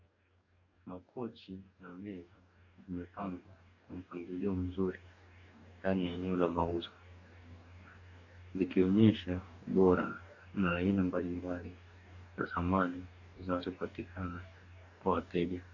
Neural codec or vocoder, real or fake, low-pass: codec, 44.1 kHz, 2.6 kbps, DAC; fake; 7.2 kHz